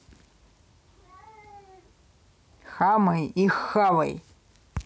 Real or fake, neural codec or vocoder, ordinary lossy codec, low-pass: real; none; none; none